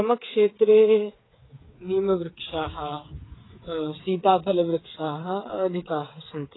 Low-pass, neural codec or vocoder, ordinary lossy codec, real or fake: 7.2 kHz; codec, 16 kHz, 8 kbps, FreqCodec, smaller model; AAC, 16 kbps; fake